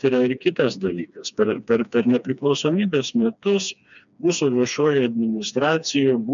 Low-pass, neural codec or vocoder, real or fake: 7.2 kHz; codec, 16 kHz, 2 kbps, FreqCodec, smaller model; fake